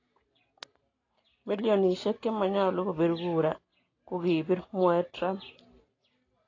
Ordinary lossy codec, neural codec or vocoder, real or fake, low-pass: AAC, 32 kbps; none; real; 7.2 kHz